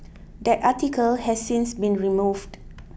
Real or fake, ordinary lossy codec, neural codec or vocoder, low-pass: real; none; none; none